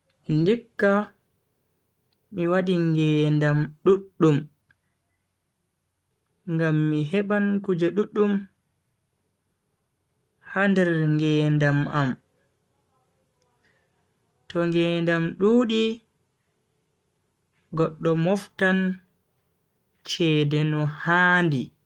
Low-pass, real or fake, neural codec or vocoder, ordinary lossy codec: 19.8 kHz; fake; codec, 44.1 kHz, 7.8 kbps, Pupu-Codec; Opus, 32 kbps